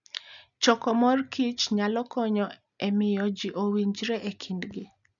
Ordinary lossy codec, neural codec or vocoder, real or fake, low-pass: none; none; real; 7.2 kHz